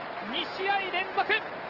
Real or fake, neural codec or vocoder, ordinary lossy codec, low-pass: real; none; Opus, 16 kbps; 5.4 kHz